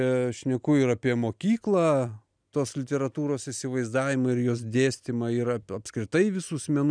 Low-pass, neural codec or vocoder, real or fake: 9.9 kHz; none; real